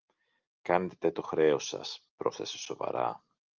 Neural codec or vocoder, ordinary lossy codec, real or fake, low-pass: none; Opus, 32 kbps; real; 7.2 kHz